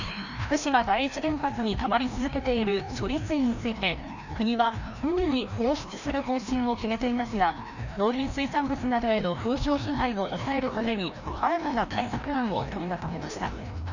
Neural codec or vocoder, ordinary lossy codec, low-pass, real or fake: codec, 16 kHz, 1 kbps, FreqCodec, larger model; none; 7.2 kHz; fake